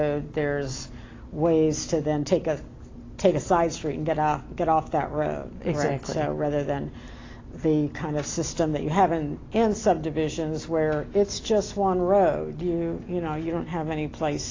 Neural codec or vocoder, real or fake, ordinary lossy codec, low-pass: none; real; AAC, 32 kbps; 7.2 kHz